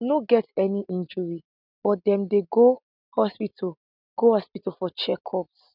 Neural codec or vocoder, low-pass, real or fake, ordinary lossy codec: none; 5.4 kHz; real; none